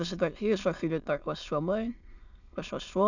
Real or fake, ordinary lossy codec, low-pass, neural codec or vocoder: fake; none; 7.2 kHz; autoencoder, 22.05 kHz, a latent of 192 numbers a frame, VITS, trained on many speakers